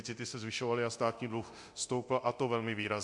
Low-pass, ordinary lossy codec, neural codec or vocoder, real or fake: 10.8 kHz; MP3, 64 kbps; codec, 24 kHz, 0.9 kbps, DualCodec; fake